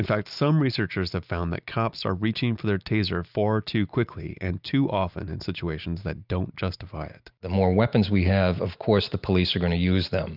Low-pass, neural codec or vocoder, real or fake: 5.4 kHz; none; real